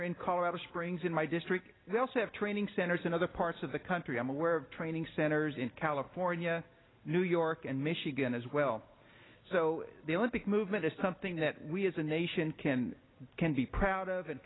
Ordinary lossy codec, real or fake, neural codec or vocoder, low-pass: AAC, 16 kbps; real; none; 7.2 kHz